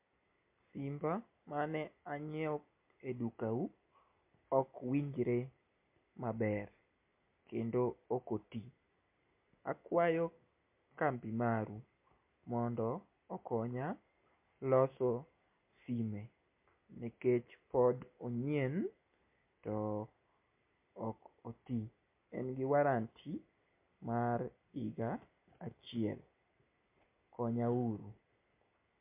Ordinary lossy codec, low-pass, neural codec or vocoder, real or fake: MP3, 32 kbps; 3.6 kHz; none; real